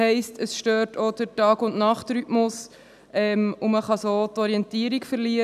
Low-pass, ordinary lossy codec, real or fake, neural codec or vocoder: 14.4 kHz; none; real; none